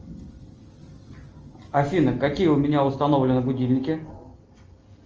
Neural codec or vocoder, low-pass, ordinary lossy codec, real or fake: none; 7.2 kHz; Opus, 24 kbps; real